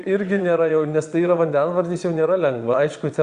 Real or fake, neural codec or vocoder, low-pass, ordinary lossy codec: fake; vocoder, 22.05 kHz, 80 mel bands, Vocos; 9.9 kHz; Opus, 64 kbps